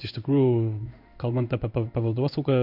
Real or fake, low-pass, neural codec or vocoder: fake; 5.4 kHz; codec, 16 kHz in and 24 kHz out, 1 kbps, XY-Tokenizer